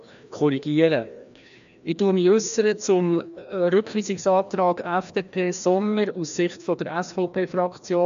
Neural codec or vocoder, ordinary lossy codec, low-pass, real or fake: codec, 16 kHz, 1 kbps, FreqCodec, larger model; AAC, 96 kbps; 7.2 kHz; fake